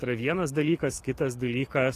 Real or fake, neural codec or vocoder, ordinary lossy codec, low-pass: fake; codec, 44.1 kHz, 7.8 kbps, DAC; AAC, 48 kbps; 14.4 kHz